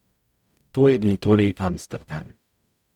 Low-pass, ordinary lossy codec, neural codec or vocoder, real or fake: 19.8 kHz; none; codec, 44.1 kHz, 0.9 kbps, DAC; fake